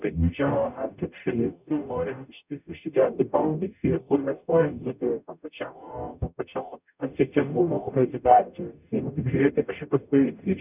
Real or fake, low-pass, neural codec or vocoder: fake; 3.6 kHz; codec, 44.1 kHz, 0.9 kbps, DAC